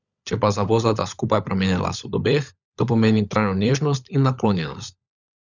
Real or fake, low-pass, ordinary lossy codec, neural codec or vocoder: fake; 7.2 kHz; none; codec, 16 kHz, 16 kbps, FunCodec, trained on LibriTTS, 50 frames a second